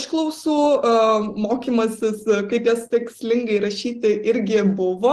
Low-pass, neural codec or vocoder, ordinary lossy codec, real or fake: 10.8 kHz; none; Opus, 16 kbps; real